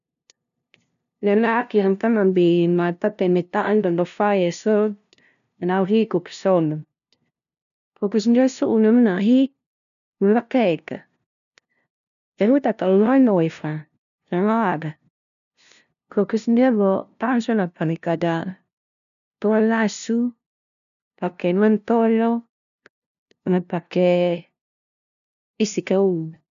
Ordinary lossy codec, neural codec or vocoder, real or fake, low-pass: none; codec, 16 kHz, 0.5 kbps, FunCodec, trained on LibriTTS, 25 frames a second; fake; 7.2 kHz